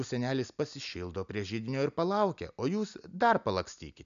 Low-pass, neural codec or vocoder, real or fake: 7.2 kHz; none; real